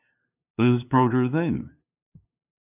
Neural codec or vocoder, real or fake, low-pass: codec, 16 kHz, 2 kbps, FunCodec, trained on LibriTTS, 25 frames a second; fake; 3.6 kHz